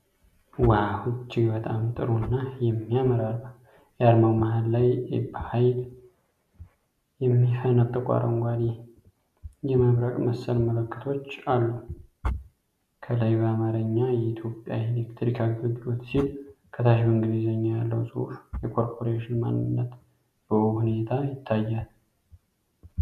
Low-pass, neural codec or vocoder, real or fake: 14.4 kHz; none; real